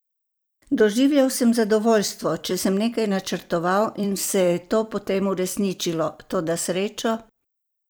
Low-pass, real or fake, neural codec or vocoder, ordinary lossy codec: none; fake; vocoder, 44.1 kHz, 128 mel bands every 256 samples, BigVGAN v2; none